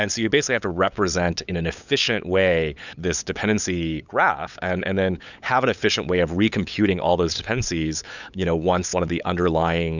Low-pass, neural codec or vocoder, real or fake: 7.2 kHz; codec, 16 kHz, 8 kbps, FunCodec, trained on LibriTTS, 25 frames a second; fake